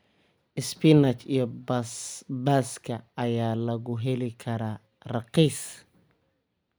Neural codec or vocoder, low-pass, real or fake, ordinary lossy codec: none; none; real; none